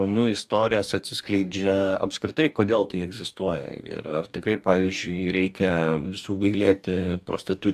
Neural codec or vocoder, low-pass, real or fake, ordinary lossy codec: codec, 44.1 kHz, 2.6 kbps, DAC; 14.4 kHz; fake; AAC, 96 kbps